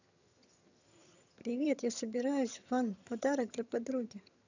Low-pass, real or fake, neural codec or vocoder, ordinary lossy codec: 7.2 kHz; fake; vocoder, 22.05 kHz, 80 mel bands, HiFi-GAN; none